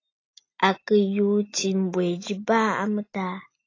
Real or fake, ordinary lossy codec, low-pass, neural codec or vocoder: real; AAC, 32 kbps; 7.2 kHz; none